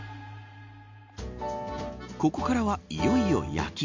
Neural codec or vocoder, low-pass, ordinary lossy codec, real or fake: none; 7.2 kHz; MP3, 48 kbps; real